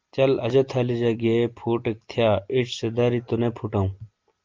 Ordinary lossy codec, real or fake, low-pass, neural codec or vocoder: Opus, 32 kbps; real; 7.2 kHz; none